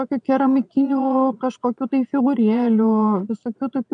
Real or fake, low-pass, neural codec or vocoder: fake; 9.9 kHz; vocoder, 22.05 kHz, 80 mel bands, Vocos